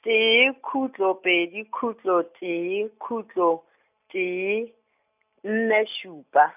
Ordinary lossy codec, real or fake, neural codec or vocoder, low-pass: none; real; none; 3.6 kHz